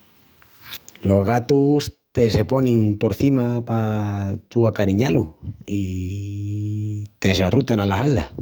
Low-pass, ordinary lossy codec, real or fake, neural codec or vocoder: none; none; fake; codec, 44.1 kHz, 2.6 kbps, SNAC